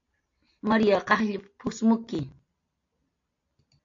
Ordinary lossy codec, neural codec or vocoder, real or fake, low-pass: AAC, 48 kbps; none; real; 7.2 kHz